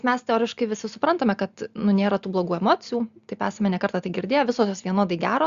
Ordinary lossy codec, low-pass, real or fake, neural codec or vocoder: Opus, 64 kbps; 7.2 kHz; real; none